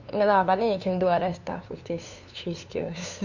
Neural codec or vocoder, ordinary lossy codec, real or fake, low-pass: codec, 16 kHz, 2 kbps, FunCodec, trained on LibriTTS, 25 frames a second; none; fake; 7.2 kHz